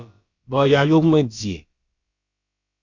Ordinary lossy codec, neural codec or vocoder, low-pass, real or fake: Opus, 64 kbps; codec, 16 kHz, about 1 kbps, DyCAST, with the encoder's durations; 7.2 kHz; fake